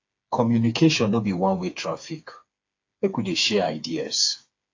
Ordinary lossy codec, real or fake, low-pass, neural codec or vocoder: AAC, 48 kbps; fake; 7.2 kHz; codec, 16 kHz, 4 kbps, FreqCodec, smaller model